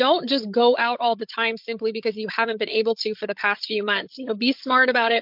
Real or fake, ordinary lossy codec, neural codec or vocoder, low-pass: fake; MP3, 48 kbps; codec, 16 kHz, 8 kbps, FreqCodec, larger model; 5.4 kHz